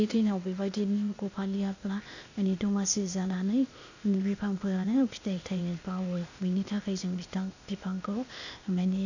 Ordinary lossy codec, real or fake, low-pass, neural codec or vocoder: none; fake; 7.2 kHz; codec, 16 kHz, 0.8 kbps, ZipCodec